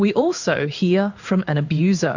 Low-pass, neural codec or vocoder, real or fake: 7.2 kHz; codec, 16 kHz in and 24 kHz out, 1 kbps, XY-Tokenizer; fake